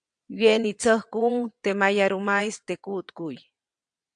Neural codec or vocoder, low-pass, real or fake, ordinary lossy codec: vocoder, 22.05 kHz, 80 mel bands, WaveNeXt; 9.9 kHz; fake; AAC, 64 kbps